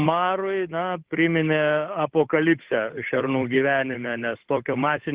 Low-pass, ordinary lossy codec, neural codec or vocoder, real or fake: 3.6 kHz; Opus, 16 kbps; codec, 16 kHz, 8 kbps, FunCodec, trained on Chinese and English, 25 frames a second; fake